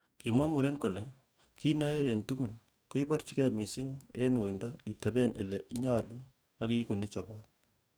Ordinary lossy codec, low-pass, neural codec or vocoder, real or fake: none; none; codec, 44.1 kHz, 2.6 kbps, DAC; fake